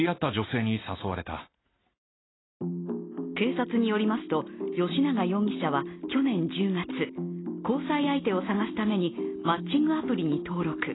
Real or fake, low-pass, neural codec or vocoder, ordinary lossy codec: real; 7.2 kHz; none; AAC, 16 kbps